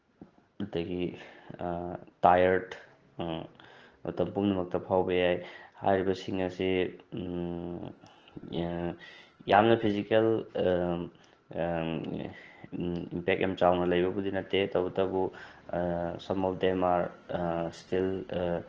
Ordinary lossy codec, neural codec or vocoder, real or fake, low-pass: Opus, 16 kbps; none; real; 7.2 kHz